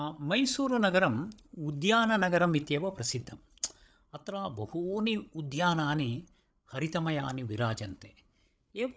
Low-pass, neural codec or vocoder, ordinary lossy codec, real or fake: none; codec, 16 kHz, 8 kbps, FreqCodec, larger model; none; fake